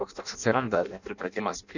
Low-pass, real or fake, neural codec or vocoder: 7.2 kHz; fake; codec, 16 kHz in and 24 kHz out, 0.6 kbps, FireRedTTS-2 codec